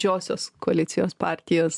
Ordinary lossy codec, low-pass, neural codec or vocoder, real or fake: MP3, 96 kbps; 10.8 kHz; none; real